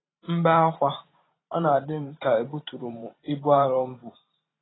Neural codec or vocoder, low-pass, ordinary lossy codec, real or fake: vocoder, 44.1 kHz, 128 mel bands every 512 samples, BigVGAN v2; 7.2 kHz; AAC, 16 kbps; fake